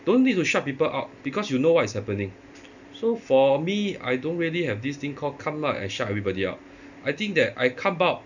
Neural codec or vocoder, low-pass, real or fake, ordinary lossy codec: none; 7.2 kHz; real; none